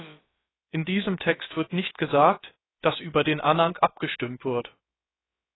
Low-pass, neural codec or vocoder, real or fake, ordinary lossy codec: 7.2 kHz; codec, 16 kHz, about 1 kbps, DyCAST, with the encoder's durations; fake; AAC, 16 kbps